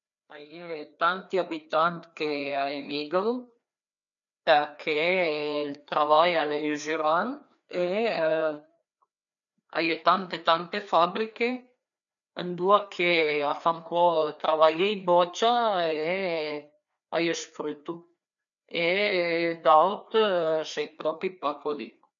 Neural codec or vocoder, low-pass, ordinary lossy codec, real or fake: codec, 16 kHz, 2 kbps, FreqCodec, larger model; 7.2 kHz; none; fake